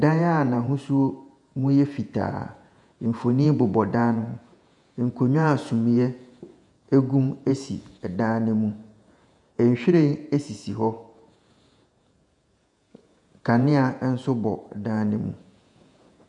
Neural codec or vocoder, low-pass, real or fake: vocoder, 48 kHz, 128 mel bands, Vocos; 10.8 kHz; fake